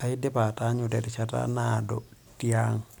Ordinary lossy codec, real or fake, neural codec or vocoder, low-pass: none; real; none; none